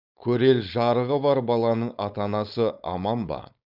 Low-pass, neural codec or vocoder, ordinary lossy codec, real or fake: 5.4 kHz; codec, 16 kHz, 4.8 kbps, FACodec; none; fake